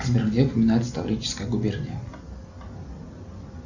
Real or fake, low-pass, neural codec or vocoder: real; 7.2 kHz; none